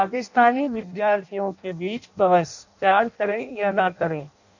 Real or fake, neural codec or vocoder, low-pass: fake; codec, 16 kHz in and 24 kHz out, 0.6 kbps, FireRedTTS-2 codec; 7.2 kHz